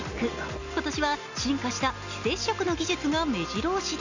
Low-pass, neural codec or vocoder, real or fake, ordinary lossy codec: 7.2 kHz; none; real; none